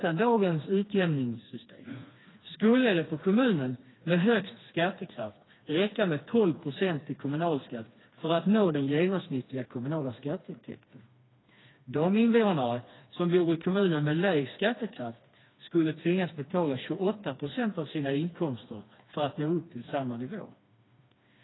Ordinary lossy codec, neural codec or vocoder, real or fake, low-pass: AAC, 16 kbps; codec, 16 kHz, 2 kbps, FreqCodec, smaller model; fake; 7.2 kHz